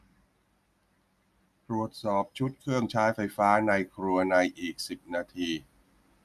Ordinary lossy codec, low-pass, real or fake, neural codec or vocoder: none; 14.4 kHz; real; none